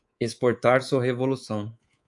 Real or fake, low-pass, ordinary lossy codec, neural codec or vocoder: fake; 10.8 kHz; AAC, 64 kbps; codec, 24 kHz, 3.1 kbps, DualCodec